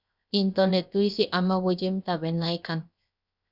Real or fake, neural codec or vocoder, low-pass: fake; codec, 16 kHz, 0.7 kbps, FocalCodec; 5.4 kHz